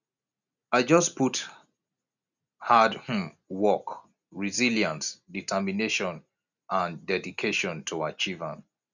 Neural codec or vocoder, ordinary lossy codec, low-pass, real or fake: none; none; 7.2 kHz; real